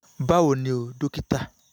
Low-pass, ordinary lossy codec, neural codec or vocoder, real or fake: none; none; none; real